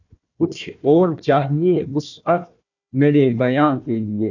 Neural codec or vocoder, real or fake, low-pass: codec, 16 kHz, 1 kbps, FunCodec, trained on Chinese and English, 50 frames a second; fake; 7.2 kHz